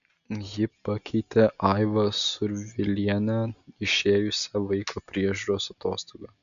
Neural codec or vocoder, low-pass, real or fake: none; 7.2 kHz; real